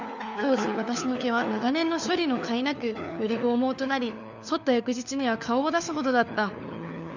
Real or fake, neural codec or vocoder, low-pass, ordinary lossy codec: fake; codec, 16 kHz, 4 kbps, FunCodec, trained on LibriTTS, 50 frames a second; 7.2 kHz; none